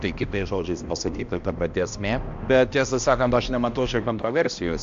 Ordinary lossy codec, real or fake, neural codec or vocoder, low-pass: AAC, 64 kbps; fake; codec, 16 kHz, 1 kbps, X-Codec, HuBERT features, trained on balanced general audio; 7.2 kHz